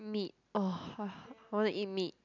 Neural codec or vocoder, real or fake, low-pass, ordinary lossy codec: none; real; 7.2 kHz; none